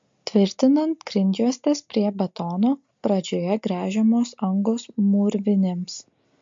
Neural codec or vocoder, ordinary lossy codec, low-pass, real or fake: none; MP3, 48 kbps; 7.2 kHz; real